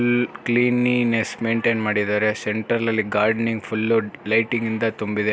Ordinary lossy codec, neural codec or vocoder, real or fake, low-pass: none; none; real; none